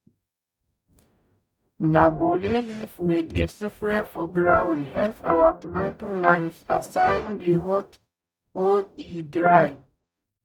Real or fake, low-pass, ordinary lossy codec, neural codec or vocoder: fake; 19.8 kHz; none; codec, 44.1 kHz, 0.9 kbps, DAC